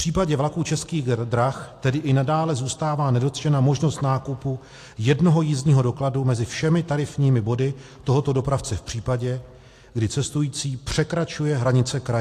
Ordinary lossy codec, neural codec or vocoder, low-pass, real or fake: AAC, 64 kbps; none; 14.4 kHz; real